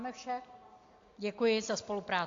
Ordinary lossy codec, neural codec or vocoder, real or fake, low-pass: AAC, 48 kbps; none; real; 7.2 kHz